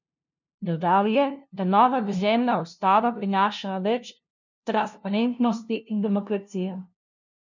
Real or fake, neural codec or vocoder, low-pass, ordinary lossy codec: fake; codec, 16 kHz, 0.5 kbps, FunCodec, trained on LibriTTS, 25 frames a second; 7.2 kHz; none